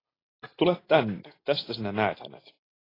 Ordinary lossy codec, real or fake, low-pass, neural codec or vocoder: AAC, 24 kbps; real; 5.4 kHz; none